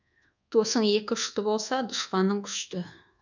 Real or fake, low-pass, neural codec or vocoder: fake; 7.2 kHz; codec, 24 kHz, 1.2 kbps, DualCodec